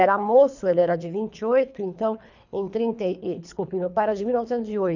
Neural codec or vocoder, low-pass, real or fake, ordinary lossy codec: codec, 24 kHz, 3 kbps, HILCodec; 7.2 kHz; fake; none